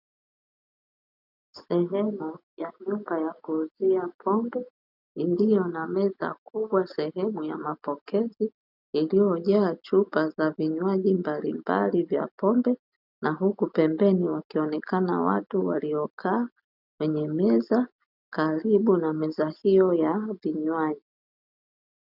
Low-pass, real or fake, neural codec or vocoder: 5.4 kHz; real; none